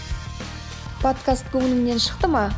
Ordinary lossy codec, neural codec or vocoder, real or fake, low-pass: none; none; real; none